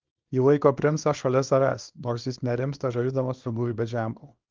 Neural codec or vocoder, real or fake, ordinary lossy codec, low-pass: codec, 24 kHz, 0.9 kbps, WavTokenizer, small release; fake; Opus, 32 kbps; 7.2 kHz